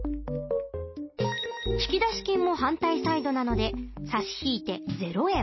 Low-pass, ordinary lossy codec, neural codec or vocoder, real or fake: 7.2 kHz; MP3, 24 kbps; none; real